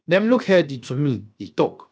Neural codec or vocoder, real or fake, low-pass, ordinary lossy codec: codec, 16 kHz, 0.7 kbps, FocalCodec; fake; none; none